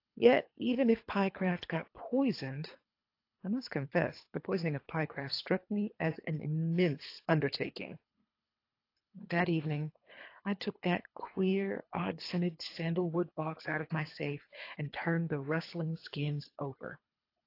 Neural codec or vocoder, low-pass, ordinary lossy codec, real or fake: codec, 24 kHz, 3 kbps, HILCodec; 5.4 kHz; AAC, 32 kbps; fake